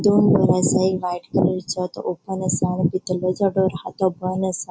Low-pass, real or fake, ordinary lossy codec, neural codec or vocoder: none; real; none; none